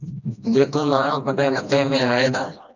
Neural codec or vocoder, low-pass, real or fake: codec, 16 kHz, 1 kbps, FreqCodec, smaller model; 7.2 kHz; fake